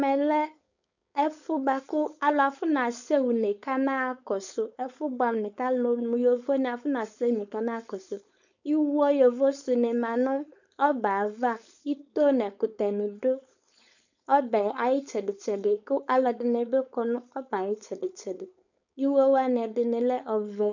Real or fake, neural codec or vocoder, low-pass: fake; codec, 16 kHz, 4.8 kbps, FACodec; 7.2 kHz